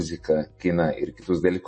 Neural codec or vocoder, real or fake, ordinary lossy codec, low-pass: none; real; MP3, 32 kbps; 10.8 kHz